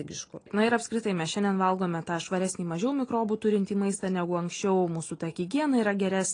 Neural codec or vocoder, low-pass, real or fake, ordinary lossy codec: none; 9.9 kHz; real; AAC, 32 kbps